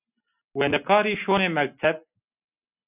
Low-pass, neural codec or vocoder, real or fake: 3.6 kHz; none; real